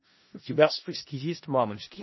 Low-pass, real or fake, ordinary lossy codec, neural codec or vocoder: 7.2 kHz; fake; MP3, 24 kbps; codec, 16 kHz in and 24 kHz out, 0.4 kbps, LongCat-Audio-Codec, four codebook decoder